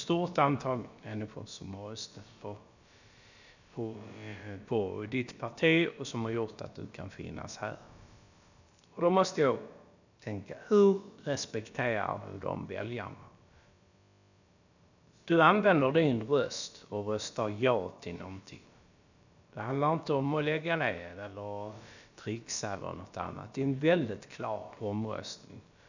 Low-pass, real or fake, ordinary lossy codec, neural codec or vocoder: 7.2 kHz; fake; none; codec, 16 kHz, about 1 kbps, DyCAST, with the encoder's durations